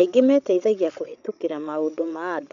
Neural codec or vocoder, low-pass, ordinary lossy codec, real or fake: codec, 16 kHz, 8 kbps, FreqCodec, larger model; 7.2 kHz; none; fake